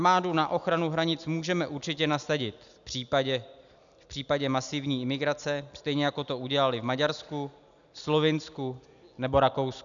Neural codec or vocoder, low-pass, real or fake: none; 7.2 kHz; real